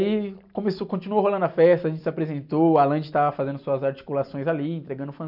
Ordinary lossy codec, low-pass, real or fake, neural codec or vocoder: none; 5.4 kHz; real; none